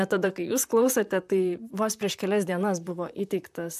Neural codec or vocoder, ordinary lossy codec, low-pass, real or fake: vocoder, 44.1 kHz, 128 mel bands, Pupu-Vocoder; MP3, 96 kbps; 14.4 kHz; fake